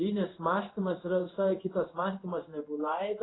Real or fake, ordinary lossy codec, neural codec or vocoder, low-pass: fake; AAC, 16 kbps; codec, 16 kHz in and 24 kHz out, 1 kbps, XY-Tokenizer; 7.2 kHz